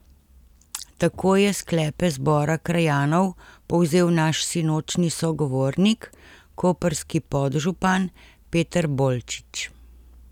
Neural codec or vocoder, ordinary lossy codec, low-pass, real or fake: none; none; 19.8 kHz; real